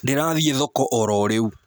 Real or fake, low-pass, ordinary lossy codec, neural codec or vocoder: real; none; none; none